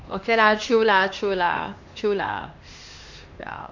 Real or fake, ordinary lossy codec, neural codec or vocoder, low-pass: fake; AAC, 48 kbps; codec, 16 kHz, 2 kbps, X-Codec, HuBERT features, trained on LibriSpeech; 7.2 kHz